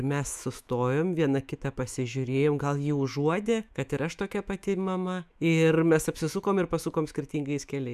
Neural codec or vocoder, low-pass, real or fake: autoencoder, 48 kHz, 128 numbers a frame, DAC-VAE, trained on Japanese speech; 14.4 kHz; fake